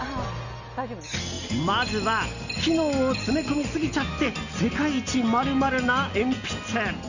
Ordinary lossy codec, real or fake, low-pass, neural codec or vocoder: Opus, 64 kbps; real; 7.2 kHz; none